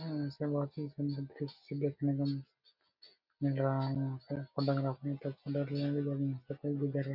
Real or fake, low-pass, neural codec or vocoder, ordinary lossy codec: real; 5.4 kHz; none; none